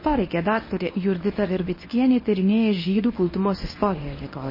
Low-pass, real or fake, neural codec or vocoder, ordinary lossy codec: 5.4 kHz; fake; codec, 24 kHz, 0.9 kbps, WavTokenizer, medium speech release version 1; MP3, 24 kbps